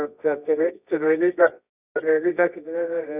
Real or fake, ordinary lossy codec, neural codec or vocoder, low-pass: fake; none; codec, 24 kHz, 0.9 kbps, WavTokenizer, medium music audio release; 3.6 kHz